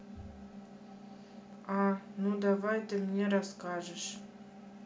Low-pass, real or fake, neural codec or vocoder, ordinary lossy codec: none; real; none; none